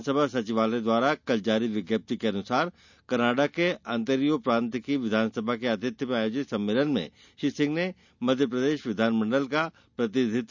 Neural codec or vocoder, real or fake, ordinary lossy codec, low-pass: none; real; none; 7.2 kHz